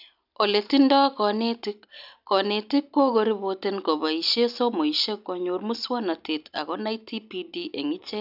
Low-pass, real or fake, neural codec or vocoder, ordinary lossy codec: 5.4 kHz; real; none; none